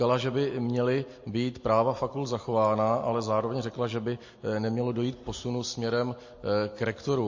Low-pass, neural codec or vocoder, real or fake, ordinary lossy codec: 7.2 kHz; none; real; MP3, 32 kbps